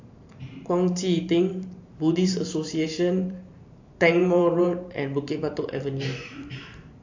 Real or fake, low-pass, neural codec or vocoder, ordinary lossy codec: fake; 7.2 kHz; vocoder, 44.1 kHz, 128 mel bands every 512 samples, BigVGAN v2; AAC, 48 kbps